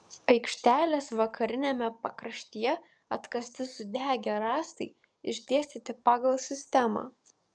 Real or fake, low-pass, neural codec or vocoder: fake; 9.9 kHz; codec, 44.1 kHz, 7.8 kbps, DAC